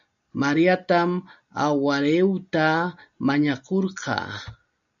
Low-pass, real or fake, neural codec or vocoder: 7.2 kHz; real; none